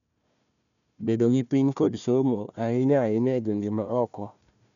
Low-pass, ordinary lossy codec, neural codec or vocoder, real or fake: 7.2 kHz; none; codec, 16 kHz, 1 kbps, FunCodec, trained on Chinese and English, 50 frames a second; fake